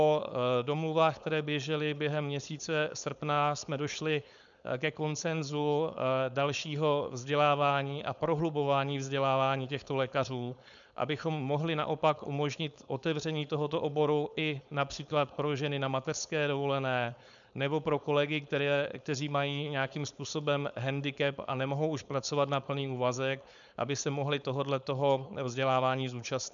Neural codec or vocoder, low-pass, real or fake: codec, 16 kHz, 4.8 kbps, FACodec; 7.2 kHz; fake